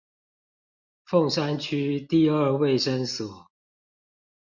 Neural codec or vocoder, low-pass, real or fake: none; 7.2 kHz; real